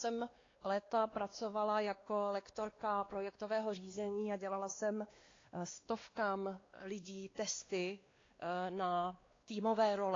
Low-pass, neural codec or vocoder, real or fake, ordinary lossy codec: 7.2 kHz; codec, 16 kHz, 2 kbps, X-Codec, WavLM features, trained on Multilingual LibriSpeech; fake; AAC, 32 kbps